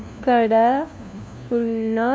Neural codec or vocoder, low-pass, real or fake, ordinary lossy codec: codec, 16 kHz, 1 kbps, FunCodec, trained on LibriTTS, 50 frames a second; none; fake; none